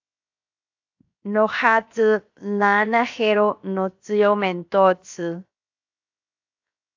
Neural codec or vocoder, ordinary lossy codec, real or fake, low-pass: codec, 16 kHz, 0.7 kbps, FocalCodec; AAC, 48 kbps; fake; 7.2 kHz